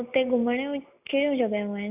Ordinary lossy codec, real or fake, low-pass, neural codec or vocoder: none; real; 3.6 kHz; none